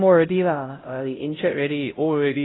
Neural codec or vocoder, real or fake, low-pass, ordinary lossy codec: codec, 16 kHz, 0.5 kbps, X-Codec, HuBERT features, trained on LibriSpeech; fake; 7.2 kHz; AAC, 16 kbps